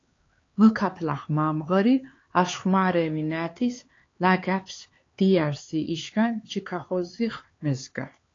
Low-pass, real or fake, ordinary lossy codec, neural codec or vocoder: 7.2 kHz; fake; AAC, 32 kbps; codec, 16 kHz, 4 kbps, X-Codec, HuBERT features, trained on LibriSpeech